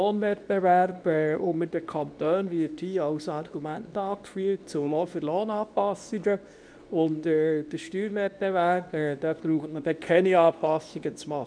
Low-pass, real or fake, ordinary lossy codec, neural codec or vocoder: 9.9 kHz; fake; none; codec, 24 kHz, 0.9 kbps, WavTokenizer, medium speech release version 2